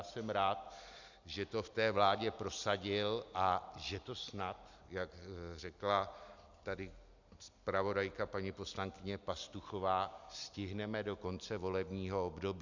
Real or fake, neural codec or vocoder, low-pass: real; none; 7.2 kHz